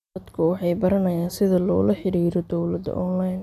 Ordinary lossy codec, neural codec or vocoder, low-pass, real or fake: MP3, 96 kbps; none; 14.4 kHz; real